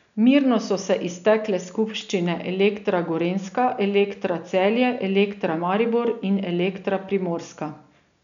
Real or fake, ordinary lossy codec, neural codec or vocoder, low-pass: real; none; none; 7.2 kHz